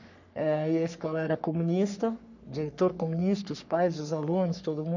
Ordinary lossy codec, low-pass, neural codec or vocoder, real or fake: none; 7.2 kHz; codec, 44.1 kHz, 3.4 kbps, Pupu-Codec; fake